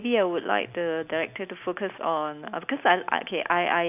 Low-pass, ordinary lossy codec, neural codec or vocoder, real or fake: 3.6 kHz; none; none; real